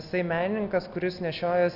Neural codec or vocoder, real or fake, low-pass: none; real; 5.4 kHz